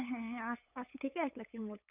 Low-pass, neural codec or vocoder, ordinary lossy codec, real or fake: 3.6 kHz; codec, 16 kHz, 8 kbps, FunCodec, trained on LibriTTS, 25 frames a second; none; fake